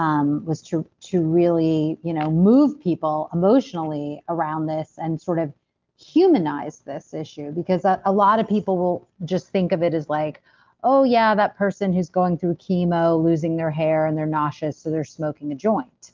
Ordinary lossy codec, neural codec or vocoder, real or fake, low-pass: Opus, 32 kbps; none; real; 7.2 kHz